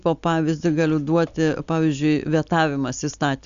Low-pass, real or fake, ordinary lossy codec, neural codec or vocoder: 7.2 kHz; real; Opus, 64 kbps; none